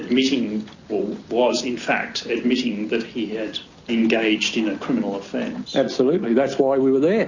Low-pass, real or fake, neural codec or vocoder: 7.2 kHz; real; none